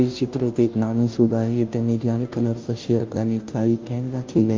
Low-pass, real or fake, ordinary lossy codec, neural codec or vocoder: 7.2 kHz; fake; Opus, 24 kbps; codec, 16 kHz, 0.5 kbps, FunCodec, trained on Chinese and English, 25 frames a second